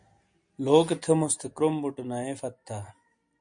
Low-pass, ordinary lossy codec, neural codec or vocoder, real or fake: 9.9 kHz; MP3, 64 kbps; none; real